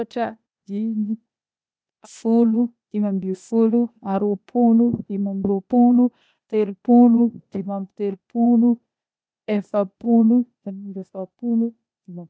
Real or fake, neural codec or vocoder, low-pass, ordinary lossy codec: fake; codec, 16 kHz, 0.8 kbps, ZipCodec; none; none